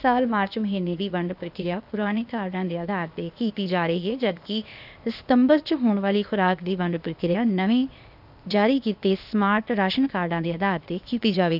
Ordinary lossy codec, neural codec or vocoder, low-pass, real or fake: none; codec, 16 kHz, 0.8 kbps, ZipCodec; 5.4 kHz; fake